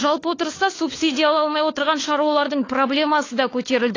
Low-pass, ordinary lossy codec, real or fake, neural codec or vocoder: 7.2 kHz; AAC, 32 kbps; fake; codec, 16 kHz, 4 kbps, FunCodec, trained on LibriTTS, 50 frames a second